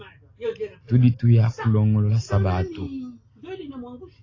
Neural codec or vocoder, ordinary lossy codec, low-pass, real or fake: none; AAC, 32 kbps; 7.2 kHz; real